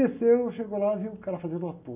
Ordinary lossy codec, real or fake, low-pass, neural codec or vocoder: none; real; 3.6 kHz; none